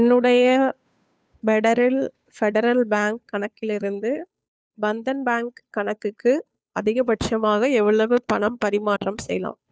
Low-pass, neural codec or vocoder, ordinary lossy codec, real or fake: none; codec, 16 kHz, 2 kbps, FunCodec, trained on Chinese and English, 25 frames a second; none; fake